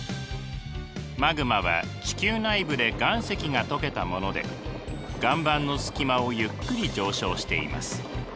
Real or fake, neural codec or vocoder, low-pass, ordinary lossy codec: real; none; none; none